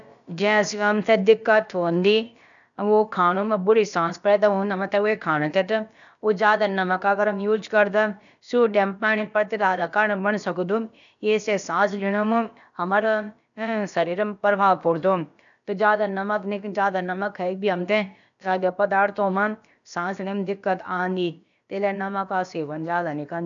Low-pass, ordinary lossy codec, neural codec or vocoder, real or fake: 7.2 kHz; none; codec, 16 kHz, about 1 kbps, DyCAST, with the encoder's durations; fake